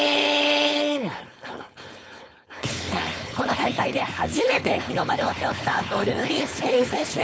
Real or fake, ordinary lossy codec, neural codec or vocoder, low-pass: fake; none; codec, 16 kHz, 4.8 kbps, FACodec; none